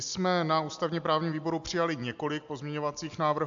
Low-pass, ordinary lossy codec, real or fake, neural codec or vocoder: 7.2 kHz; AAC, 64 kbps; real; none